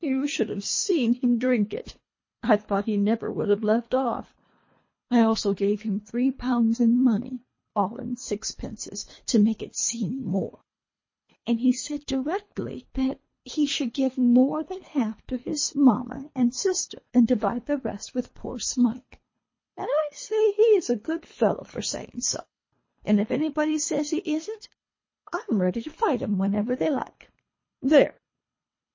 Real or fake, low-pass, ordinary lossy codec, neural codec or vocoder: fake; 7.2 kHz; MP3, 32 kbps; codec, 24 kHz, 3 kbps, HILCodec